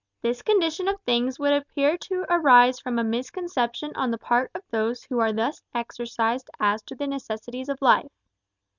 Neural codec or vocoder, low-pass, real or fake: none; 7.2 kHz; real